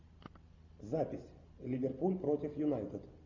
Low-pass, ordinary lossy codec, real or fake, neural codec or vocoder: 7.2 kHz; MP3, 48 kbps; real; none